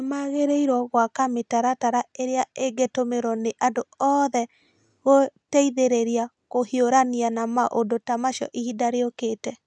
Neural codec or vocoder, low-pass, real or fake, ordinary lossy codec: none; none; real; none